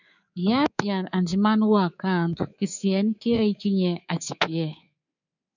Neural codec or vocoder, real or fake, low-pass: codec, 24 kHz, 3.1 kbps, DualCodec; fake; 7.2 kHz